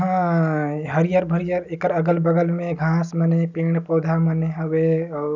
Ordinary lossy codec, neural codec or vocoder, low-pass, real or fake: none; none; 7.2 kHz; real